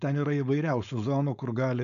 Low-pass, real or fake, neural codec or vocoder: 7.2 kHz; fake; codec, 16 kHz, 4.8 kbps, FACodec